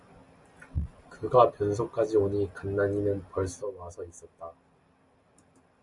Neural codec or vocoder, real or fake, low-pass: none; real; 10.8 kHz